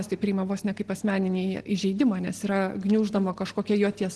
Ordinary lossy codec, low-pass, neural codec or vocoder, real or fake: Opus, 16 kbps; 10.8 kHz; none; real